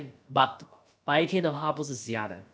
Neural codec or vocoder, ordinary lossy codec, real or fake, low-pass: codec, 16 kHz, about 1 kbps, DyCAST, with the encoder's durations; none; fake; none